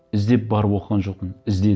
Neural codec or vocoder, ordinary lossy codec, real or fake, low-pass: none; none; real; none